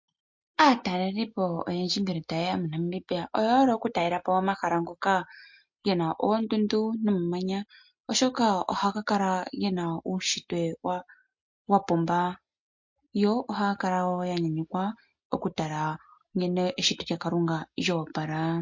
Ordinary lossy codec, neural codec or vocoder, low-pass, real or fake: MP3, 48 kbps; none; 7.2 kHz; real